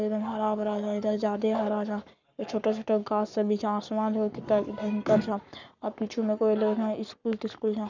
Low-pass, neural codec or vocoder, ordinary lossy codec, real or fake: 7.2 kHz; codec, 44.1 kHz, 7.8 kbps, Pupu-Codec; none; fake